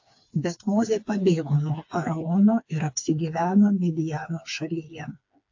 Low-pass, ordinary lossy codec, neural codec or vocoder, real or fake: 7.2 kHz; AAC, 48 kbps; codec, 16 kHz, 4 kbps, FreqCodec, smaller model; fake